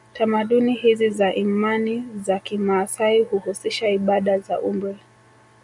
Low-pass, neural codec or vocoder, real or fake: 10.8 kHz; none; real